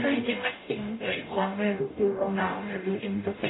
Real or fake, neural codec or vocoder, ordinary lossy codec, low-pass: fake; codec, 44.1 kHz, 0.9 kbps, DAC; AAC, 16 kbps; 7.2 kHz